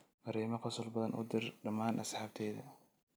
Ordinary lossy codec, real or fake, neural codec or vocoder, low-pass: none; real; none; none